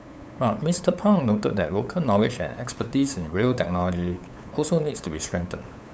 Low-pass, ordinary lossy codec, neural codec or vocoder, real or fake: none; none; codec, 16 kHz, 8 kbps, FunCodec, trained on LibriTTS, 25 frames a second; fake